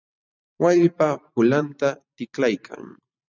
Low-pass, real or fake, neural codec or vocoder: 7.2 kHz; fake; vocoder, 44.1 kHz, 128 mel bands every 512 samples, BigVGAN v2